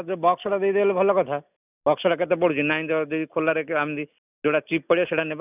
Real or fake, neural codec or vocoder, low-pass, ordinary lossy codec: real; none; 3.6 kHz; none